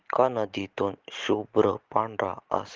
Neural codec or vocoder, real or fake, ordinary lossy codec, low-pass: none; real; Opus, 16 kbps; 7.2 kHz